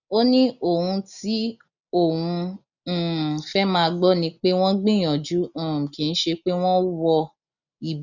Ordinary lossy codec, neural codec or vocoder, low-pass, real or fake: none; none; 7.2 kHz; real